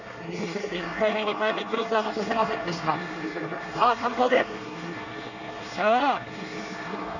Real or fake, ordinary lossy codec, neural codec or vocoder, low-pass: fake; none; codec, 24 kHz, 1 kbps, SNAC; 7.2 kHz